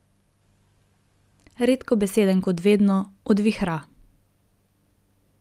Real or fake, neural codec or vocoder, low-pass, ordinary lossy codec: real; none; 14.4 kHz; Opus, 32 kbps